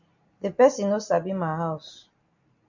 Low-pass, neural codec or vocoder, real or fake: 7.2 kHz; none; real